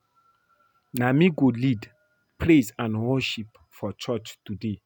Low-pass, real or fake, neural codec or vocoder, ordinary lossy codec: 19.8 kHz; real; none; none